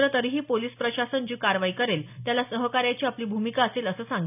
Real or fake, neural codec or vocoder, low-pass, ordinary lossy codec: real; none; 3.6 kHz; none